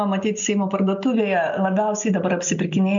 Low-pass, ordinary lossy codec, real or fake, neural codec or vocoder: 7.2 kHz; MP3, 64 kbps; real; none